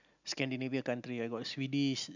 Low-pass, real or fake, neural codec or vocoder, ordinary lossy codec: 7.2 kHz; real; none; none